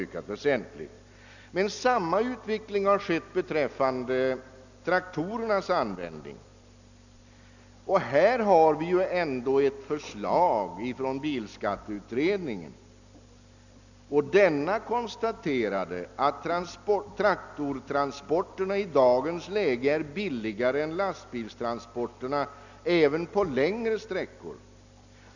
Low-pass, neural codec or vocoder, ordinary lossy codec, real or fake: 7.2 kHz; none; none; real